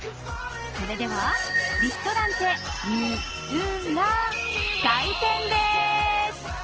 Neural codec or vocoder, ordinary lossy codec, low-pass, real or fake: none; Opus, 16 kbps; 7.2 kHz; real